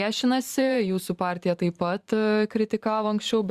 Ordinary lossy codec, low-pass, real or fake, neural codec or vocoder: Opus, 64 kbps; 14.4 kHz; fake; vocoder, 44.1 kHz, 128 mel bands every 512 samples, BigVGAN v2